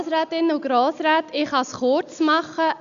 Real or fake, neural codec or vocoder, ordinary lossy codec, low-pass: real; none; none; 7.2 kHz